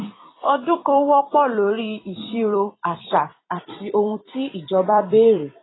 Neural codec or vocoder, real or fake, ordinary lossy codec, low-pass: vocoder, 44.1 kHz, 128 mel bands every 256 samples, BigVGAN v2; fake; AAC, 16 kbps; 7.2 kHz